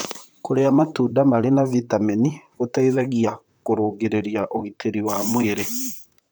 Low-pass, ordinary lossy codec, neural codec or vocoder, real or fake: none; none; vocoder, 44.1 kHz, 128 mel bands, Pupu-Vocoder; fake